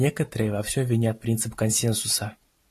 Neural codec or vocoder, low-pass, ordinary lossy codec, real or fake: none; 14.4 kHz; AAC, 48 kbps; real